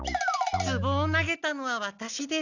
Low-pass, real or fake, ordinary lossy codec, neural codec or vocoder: 7.2 kHz; real; none; none